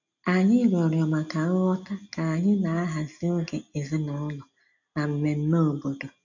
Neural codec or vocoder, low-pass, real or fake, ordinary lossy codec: none; 7.2 kHz; real; none